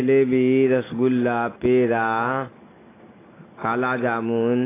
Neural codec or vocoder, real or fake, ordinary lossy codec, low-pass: none; real; AAC, 16 kbps; 3.6 kHz